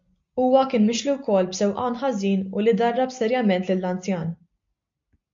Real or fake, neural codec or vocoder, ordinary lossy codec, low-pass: real; none; MP3, 48 kbps; 7.2 kHz